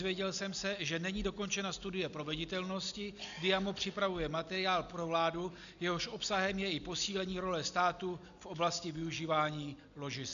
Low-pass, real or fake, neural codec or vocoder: 7.2 kHz; real; none